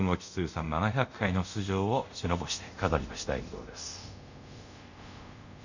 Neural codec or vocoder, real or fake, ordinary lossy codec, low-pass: codec, 24 kHz, 0.5 kbps, DualCodec; fake; none; 7.2 kHz